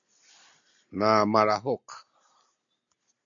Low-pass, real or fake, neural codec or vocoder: 7.2 kHz; real; none